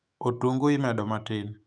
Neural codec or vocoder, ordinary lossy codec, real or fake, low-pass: autoencoder, 48 kHz, 128 numbers a frame, DAC-VAE, trained on Japanese speech; none; fake; 9.9 kHz